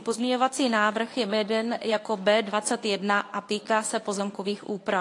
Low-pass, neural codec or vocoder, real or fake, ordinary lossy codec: 10.8 kHz; codec, 24 kHz, 0.9 kbps, WavTokenizer, medium speech release version 1; fake; AAC, 48 kbps